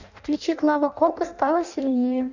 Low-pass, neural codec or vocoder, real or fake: 7.2 kHz; codec, 16 kHz in and 24 kHz out, 0.6 kbps, FireRedTTS-2 codec; fake